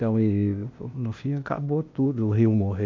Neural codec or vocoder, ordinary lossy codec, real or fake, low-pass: codec, 16 kHz, 0.8 kbps, ZipCodec; MP3, 64 kbps; fake; 7.2 kHz